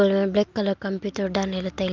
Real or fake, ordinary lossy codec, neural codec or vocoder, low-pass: real; Opus, 32 kbps; none; 7.2 kHz